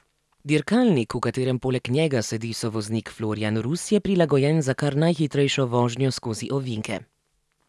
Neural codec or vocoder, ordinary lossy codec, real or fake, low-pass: none; none; real; none